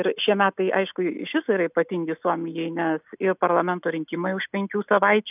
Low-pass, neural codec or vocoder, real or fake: 3.6 kHz; none; real